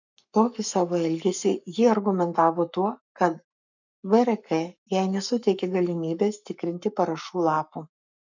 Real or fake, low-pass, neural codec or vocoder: fake; 7.2 kHz; codec, 44.1 kHz, 7.8 kbps, Pupu-Codec